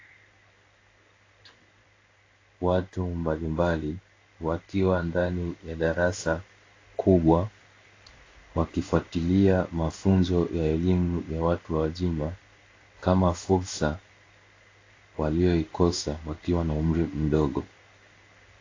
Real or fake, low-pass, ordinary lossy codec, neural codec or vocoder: fake; 7.2 kHz; AAC, 32 kbps; codec, 16 kHz in and 24 kHz out, 1 kbps, XY-Tokenizer